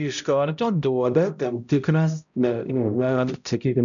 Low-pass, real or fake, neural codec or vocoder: 7.2 kHz; fake; codec, 16 kHz, 0.5 kbps, X-Codec, HuBERT features, trained on balanced general audio